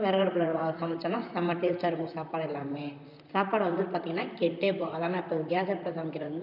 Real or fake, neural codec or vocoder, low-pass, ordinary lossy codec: fake; vocoder, 44.1 kHz, 128 mel bands, Pupu-Vocoder; 5.4 kHz; none